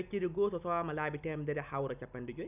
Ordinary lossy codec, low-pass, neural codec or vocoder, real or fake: none; 3.6 kHz; none; real